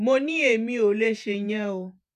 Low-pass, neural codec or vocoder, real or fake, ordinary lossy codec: 14.4 kHz; vocoder, 48 kHz, 128 mel bands, Vocos; fake; none